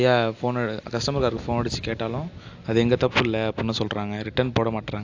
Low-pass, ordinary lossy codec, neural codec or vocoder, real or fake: 7.2 kHz; AAC, 48 kbps; none; real